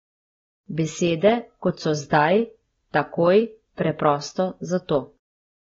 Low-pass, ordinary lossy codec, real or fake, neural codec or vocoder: 7.2 kHz; AAC, 24 kbps; real; none